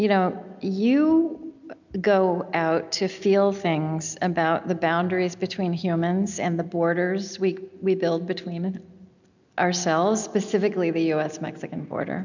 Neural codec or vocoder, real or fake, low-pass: none; real; 7.2 kHz